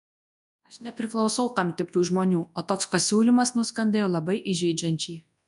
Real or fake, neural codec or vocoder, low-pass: fake; codec, 24 kHz, 0.9 kbps, WavTokenizer, large speech release; 10.8 kHz